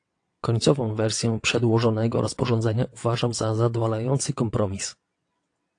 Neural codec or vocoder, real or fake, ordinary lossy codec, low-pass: vocoder, 22.05 kHz, 80 mel bands, WaveNeXt; fake; AAC, 48 kbps; 9.9 kHz